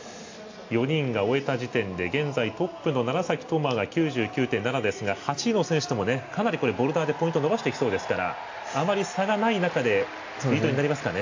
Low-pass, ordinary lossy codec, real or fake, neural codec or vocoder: 7.2 kHz; AAC, 48 kbps; real; none